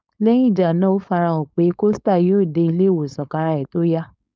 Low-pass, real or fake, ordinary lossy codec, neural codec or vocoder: none; fake; none; codec, 16 kHz, 4.8 kbps, FACodec